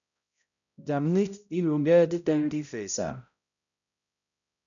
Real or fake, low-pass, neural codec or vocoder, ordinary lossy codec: fake; 7.2 kHz; codec, 16 kHz, 0.5 kbps, X-Codec, HuBERT features, trained on balanced general audio; MP3, 96 kbps